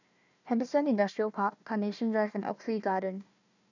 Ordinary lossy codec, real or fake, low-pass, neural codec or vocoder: none; fake; 7.2 kHz; codec, 16 kHz, 1 kbps, FunCodec, trained on Chinese and English, 50 frames a second